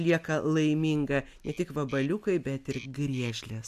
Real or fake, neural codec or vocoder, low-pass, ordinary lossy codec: real; none; 14.4 kHz; AAC, 96 kbps